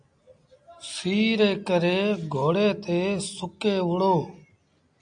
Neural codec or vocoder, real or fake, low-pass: none; real; 9.9 kHz